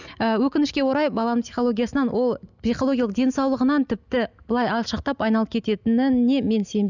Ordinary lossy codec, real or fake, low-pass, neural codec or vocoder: none; real; 7.2 kHz; none